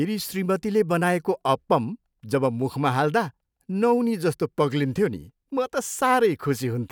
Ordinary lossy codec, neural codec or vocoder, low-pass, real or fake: none; none; none; real